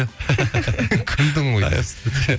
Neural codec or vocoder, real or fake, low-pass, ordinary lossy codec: none; real; none; none